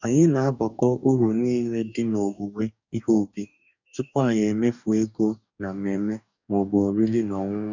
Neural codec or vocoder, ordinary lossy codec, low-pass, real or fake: codec, 44.1 kHz, 2.6 kbps, DAC; none; 7.2 kHz; fake